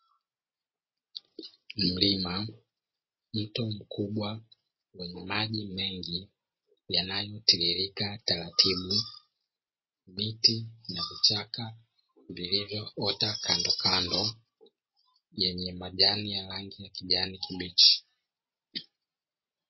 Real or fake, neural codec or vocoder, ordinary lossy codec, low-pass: real; none; MP3, 24 kbps; 7.2 kHz